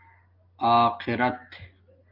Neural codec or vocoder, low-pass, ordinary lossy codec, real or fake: none; 5.4 kHz; Opus, 24 kbps; real